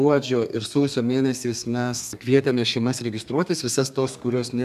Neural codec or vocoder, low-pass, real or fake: codec, 32 kHz, 1.9 kbps, SNAC; 14.4 kHz; fake